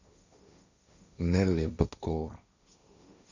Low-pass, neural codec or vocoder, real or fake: 7.2 kHz; codec, 16 kHz, 1.1 kbps, Voila-Tokenizer; fake